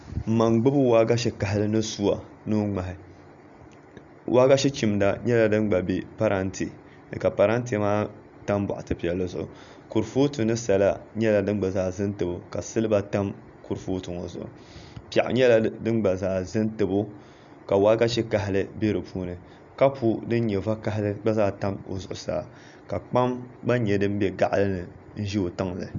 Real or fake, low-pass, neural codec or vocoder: real; 7.2 kHz; none